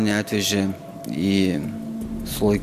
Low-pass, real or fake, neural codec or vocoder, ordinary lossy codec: 14.4 kHz; real; none; Opus, 64 kbps